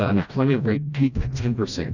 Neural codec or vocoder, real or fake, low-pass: codec, 16 kHz, 1 kbps, FreqCodec, smaller model; fake; 7.2 kHz